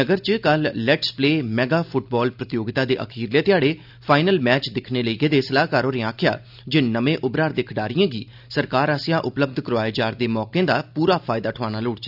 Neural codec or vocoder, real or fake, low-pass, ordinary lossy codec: none; real; 5.4 kHz; none